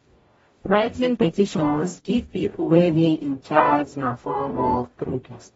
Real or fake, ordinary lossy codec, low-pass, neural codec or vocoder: fake; AAC, 24 kbps; 19.8 kHz; codec, 44.1 kHz, 0.9 kbps, DAC